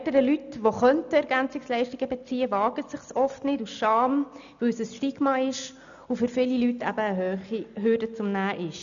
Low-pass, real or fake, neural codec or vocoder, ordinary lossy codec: 7.2 kHz; real; none; none